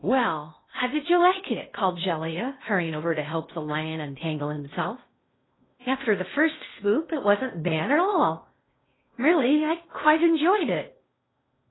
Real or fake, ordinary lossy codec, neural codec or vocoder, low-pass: fake; AAC, 16 kbps; codec, 16 kHz in and 24 kHz out, 0.6 kbps, FocalCodec, streaming, 2048 codes; 7.2 kHz